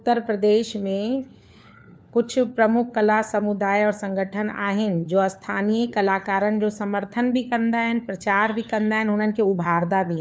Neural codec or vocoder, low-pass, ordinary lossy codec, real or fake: codec, 16 kHz, 4 kbps, FunCodec, trained on LibriTTS, 50 frames a second; none; none; fake